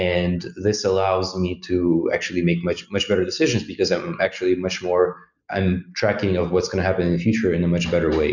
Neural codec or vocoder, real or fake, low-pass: none; real; 7.2 kHz